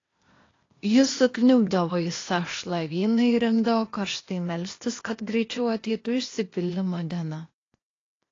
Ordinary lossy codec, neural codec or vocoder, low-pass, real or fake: AAC, 32 kbps; codec, 16 kHz, 0.8 kbps, ZipCodec; 7.2 kHz; fake